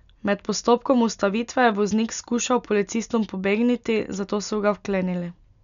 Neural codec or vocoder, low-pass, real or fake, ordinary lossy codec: none; 7.2 kHz; real; none